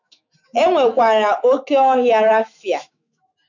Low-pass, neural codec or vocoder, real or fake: 7.2 kHz; autoencoder, 48 kHz, 128 numbers a frame, DAC-VAE, trained on Japanese speech; fake